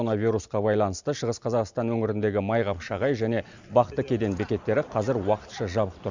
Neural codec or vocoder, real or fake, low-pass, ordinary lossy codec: none; real; 7.2 kHz; Opus, 64 kbps